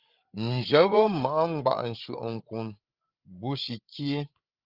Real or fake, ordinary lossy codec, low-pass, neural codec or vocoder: fake; Opus, 32 kbps; 5.4 kHz; codec, 16 kHz, 8 kbps, FreqCodec, larger model